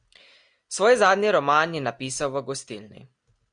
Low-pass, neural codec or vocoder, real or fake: 9.9 kHz; none; real